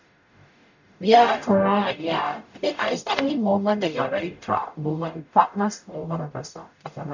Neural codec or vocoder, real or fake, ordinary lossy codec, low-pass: codec, 44.1 kHz, 0.9 kbps, DAC; fake; none; 7.2 kHz